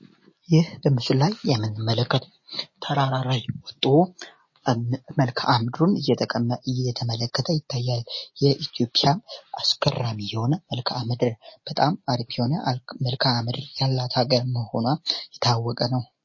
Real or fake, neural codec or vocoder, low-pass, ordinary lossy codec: real; none; 7.2 kHz; MP3, 32 kbps